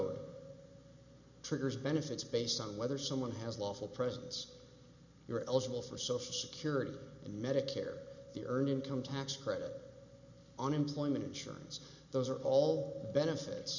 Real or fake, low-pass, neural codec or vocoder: real; 7.2 kHz; none